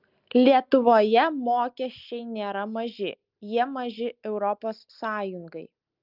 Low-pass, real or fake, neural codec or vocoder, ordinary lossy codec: 5.4 kHz; real; none; Opus, 24 kbps